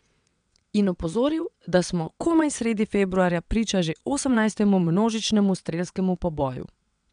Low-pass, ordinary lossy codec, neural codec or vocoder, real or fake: 9.9 kHz; none; vocoder, 22.05 kHz, 80 mel bands, WaveNeXt; fake